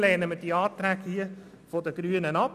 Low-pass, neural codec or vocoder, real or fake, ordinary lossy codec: 14.4 kHz; none; real; none